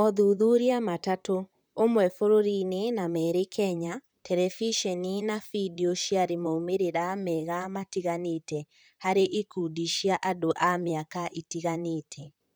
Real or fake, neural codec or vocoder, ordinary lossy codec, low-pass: fake; vocoder, 44.1 kHz, 128 mel bands, Pupu-Vocoder; none; none